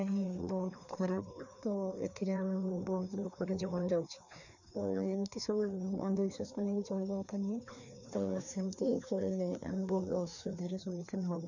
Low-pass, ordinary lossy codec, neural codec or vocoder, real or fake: 7.2 kHz; none; codec, 16 kHz, 2 kbps, FreqCodec, larger model; fake